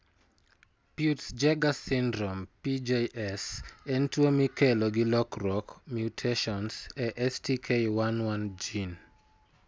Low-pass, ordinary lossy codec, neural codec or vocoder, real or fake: none; none; none; real